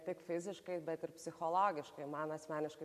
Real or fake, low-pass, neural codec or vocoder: real; 14.4 kHz; none